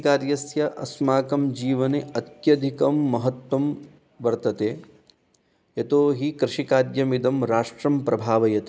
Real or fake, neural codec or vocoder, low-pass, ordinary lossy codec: real; none; none; none